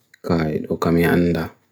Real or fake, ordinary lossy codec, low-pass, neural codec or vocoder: real; none; none; none